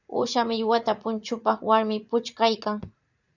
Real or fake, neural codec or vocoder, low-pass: fake; vocoder, 44.1 kHz, 80 mel bands, Vocos; 7.2 kHz